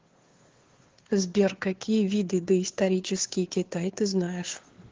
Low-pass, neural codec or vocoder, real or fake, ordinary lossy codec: 7.2 kHz; codec, 16 kHz, 2 kbps, FunCodec, trained on Chinese and English, 25 frames a second; fake; Opus, 16 kbps